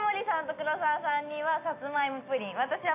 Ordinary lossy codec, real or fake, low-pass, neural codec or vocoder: AAC, 24 kbps; real; 3.6 kHz; none